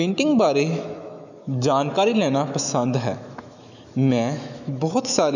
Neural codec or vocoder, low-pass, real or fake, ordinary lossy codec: autoencoder, 48 kHz, 128 numbers a frame, DAC-VAE, trained on Japanese speech; 7.2 kHz; fake; none